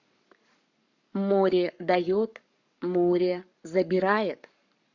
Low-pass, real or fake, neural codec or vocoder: 7.2 kHz; fake; codec, 44.1 kHz, 7.8 kbps, Pupu-Codec